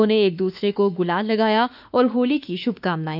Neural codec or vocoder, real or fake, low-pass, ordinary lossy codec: autoencoder, 48 kHz, 32 numbers a frame, DAC-VAE, trained on Japanese speech; fake; 5.4 kHz; none